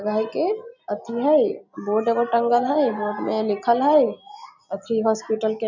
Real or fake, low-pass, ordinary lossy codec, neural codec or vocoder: real; 7.2 kHz; none; none